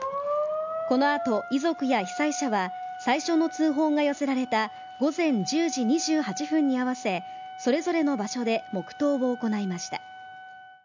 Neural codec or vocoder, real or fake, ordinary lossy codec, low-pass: none; real; none; 7.2 kHz